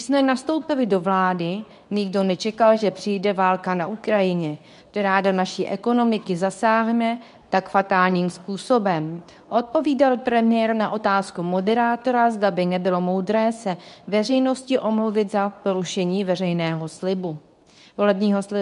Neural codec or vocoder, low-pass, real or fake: codec, 24 kHz, 0.9 kbps, WavTokenizer, medium speech release version 2; 10.8 kHz; fake